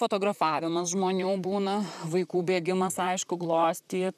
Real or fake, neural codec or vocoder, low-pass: fake; vocoder, 44.1 kHz, 128 mel bands, Pupu-Vocoder; 14.4 kHz